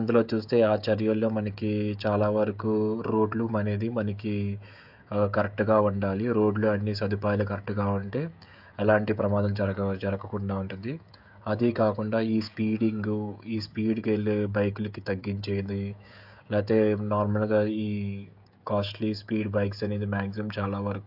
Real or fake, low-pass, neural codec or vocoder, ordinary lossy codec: fake; 5.4 kHz; codec, 16 kHz, 16 kbps, FreqCodec, smaller model; AAC, 48 kbps